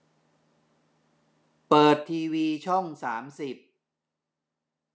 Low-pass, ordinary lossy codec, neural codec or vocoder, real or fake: none; none; none; real